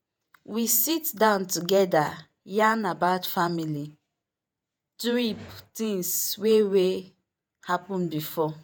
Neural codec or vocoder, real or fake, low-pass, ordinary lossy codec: vocoder, 48 kHz, 128 mel bands, Vocos; fake; none; none